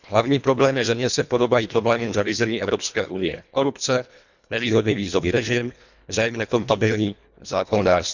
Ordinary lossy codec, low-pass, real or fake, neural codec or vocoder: none; 7.2 kHz; fake; codec, 24 kHz, 1.5 kbps, HILCodec